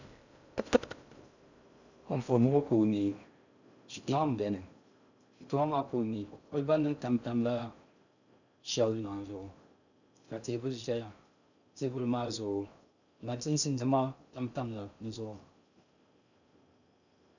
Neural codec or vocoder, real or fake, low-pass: codec, 16 kHz in and 24 kHz out, 0.6 kbps, FocalCodec, streaming, 2048 codes; fake; 7.2 kHz